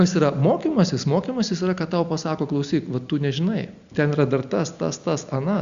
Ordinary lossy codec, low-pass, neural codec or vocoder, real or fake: Opus, 64 kbps; 7.2 kHz; none; real